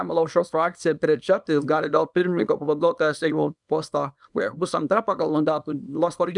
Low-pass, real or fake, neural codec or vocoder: 10.8 kHz; fake; codec, 24 kHz, 0.9 kbps, WavTokenizer, small release